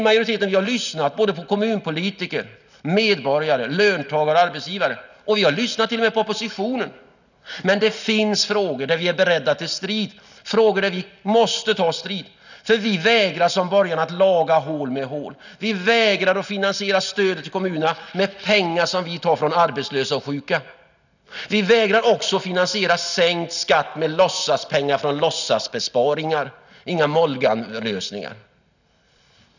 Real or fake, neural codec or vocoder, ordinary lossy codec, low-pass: real; none; none; 7.2 kHz